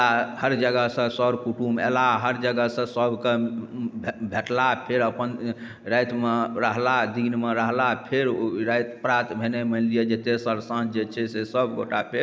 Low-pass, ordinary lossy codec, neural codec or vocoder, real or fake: none; none; none; real